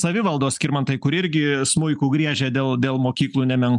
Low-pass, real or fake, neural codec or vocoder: 10.8 kHz; real; none